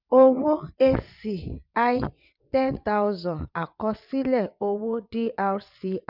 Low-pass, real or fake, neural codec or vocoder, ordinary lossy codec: 5.4 kHz; fake; vocoder, 22.05 kHz, 80 mel bands, WaveNeXt; none